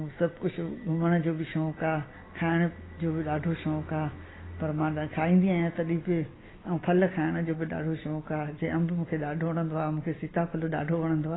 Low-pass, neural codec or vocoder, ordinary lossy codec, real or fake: 7.2 kHz; none; AAC, 16 kbps; real